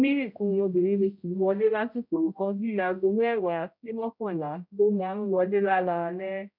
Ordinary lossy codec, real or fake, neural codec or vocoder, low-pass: none; fake; codec, 16 kHz, 0.5 kbps, X-Codec, HuBERT features, trained on general audio; 5.4 kHz